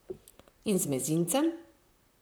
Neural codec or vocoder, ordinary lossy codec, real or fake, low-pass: vocoder, 44.1 kHz, 128 mel bands, Pupu-Vocoder; none; fake; none